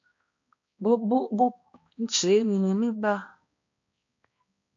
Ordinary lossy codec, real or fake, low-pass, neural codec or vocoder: MP3, 48 kbps; fake; 7.2 kHz; codec, 16 kHz, 1 kbps, X-Codec, HuBERT features, trained on balanced general audio